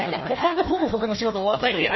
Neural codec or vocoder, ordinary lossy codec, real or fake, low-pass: codec, 16 kHz, 1 kbps, FunCodec, trained on Chinese and English, 50 frames a second; MP3, 24 kbps; fake; 7.2 kHz